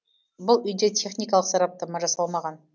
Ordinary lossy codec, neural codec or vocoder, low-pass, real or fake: none; none; none; real